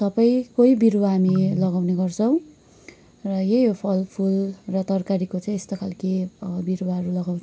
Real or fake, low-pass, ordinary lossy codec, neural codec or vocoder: real; none; none; none